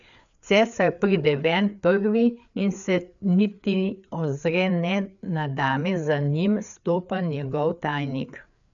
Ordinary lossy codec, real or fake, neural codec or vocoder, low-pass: none; fake; codec, 16 kHz, 4 kbps, FreqCodec, larger model; 7.2 kHz